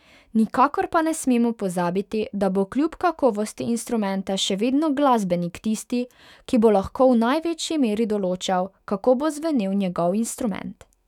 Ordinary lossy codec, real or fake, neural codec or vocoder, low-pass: none; fake; autoencoder, 48 kHz, 128 numbers a frame, DAC-VAE, trained on Japanese speech; 19.8 kHz